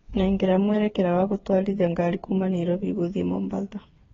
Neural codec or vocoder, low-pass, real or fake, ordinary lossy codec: codec, 16 kHz, 8 kbps, FreqCodec, smaller model; 7.2 kHz; fake; AAC, 24 kbps